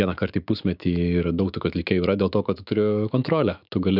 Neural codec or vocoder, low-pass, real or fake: autoencoder, 48 kHz, 128 numbers a frame, DAC-VAE, trained on Japanese speech; 5.4 kHz; fake